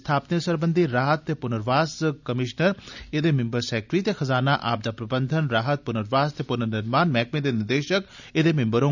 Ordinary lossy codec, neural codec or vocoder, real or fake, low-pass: none; none; real; 7.2 kHz